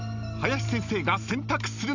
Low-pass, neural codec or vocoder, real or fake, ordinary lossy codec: 7.2 kHz; none; real; none